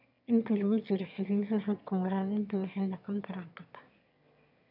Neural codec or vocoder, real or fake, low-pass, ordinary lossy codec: autoencoder, 22.05 kHz, a latent of 192 numbers a frame, VITS, trained on one speaker; fake; 5.4 kHz; none